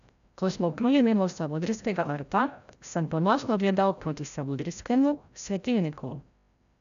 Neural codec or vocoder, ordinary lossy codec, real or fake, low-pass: codec, 16 kHz, 0.5 kbps, FreqCodec, larger model; none; fake; 7.2 kHz